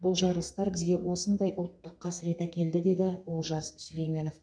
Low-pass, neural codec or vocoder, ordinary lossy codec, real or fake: 9.9 kHz; codec, 32 kHz, 1.9 kbps, SNAC; none; fake